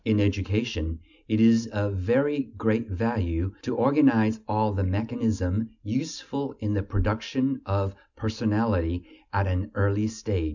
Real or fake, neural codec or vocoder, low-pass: real; none; 7.2 kHz